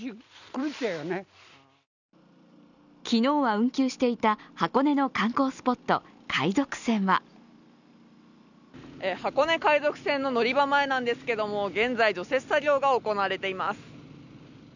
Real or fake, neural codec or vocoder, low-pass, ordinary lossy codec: real; none; 7.2 kHz; none